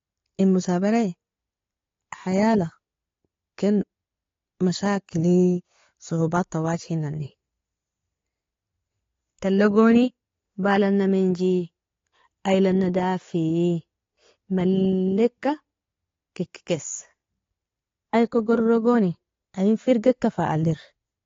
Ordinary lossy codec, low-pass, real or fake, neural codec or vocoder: AAC, 32 kbps; 7.2 kHz; real; none